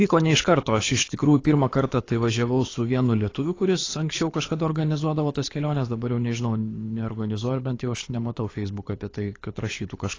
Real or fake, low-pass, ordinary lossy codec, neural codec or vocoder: fake; 7.2 kHz; AAC, 32 kbps; codec, 24 kHz, 6 kbps, HILCodec